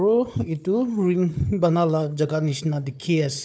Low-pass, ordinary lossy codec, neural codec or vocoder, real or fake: none; none; codec, 16 kHz, 4 kbps, FunCodec, trained on Chinese and English, 50 frames a second; fake